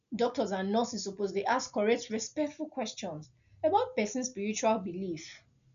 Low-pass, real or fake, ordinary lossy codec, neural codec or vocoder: 7.2 kHz; real; none; none